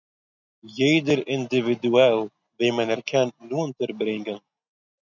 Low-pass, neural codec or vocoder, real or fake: 7.2 kHz; none; real